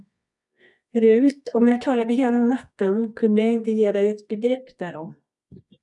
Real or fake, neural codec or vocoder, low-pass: fake; codec, 24 kHz, 0.9 kbps, WavTokenizer, medium music audio release; 10.8 kHz